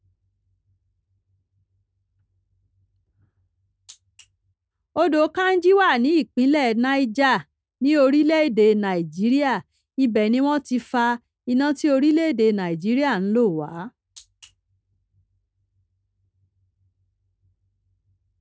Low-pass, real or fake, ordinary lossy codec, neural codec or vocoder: none; real; none; none